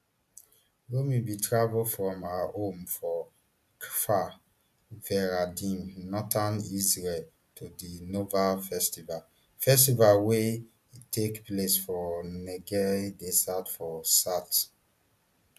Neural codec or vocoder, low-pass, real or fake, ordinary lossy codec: none; 14.4 kHz; real; none